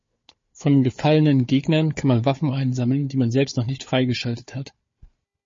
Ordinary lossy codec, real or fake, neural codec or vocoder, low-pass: MP3, 32 kbps; fake; codec, 16 kHz, 4 kbps, FunCodec, trained on Chinese and English, 50 frames a second; 7.2 kHz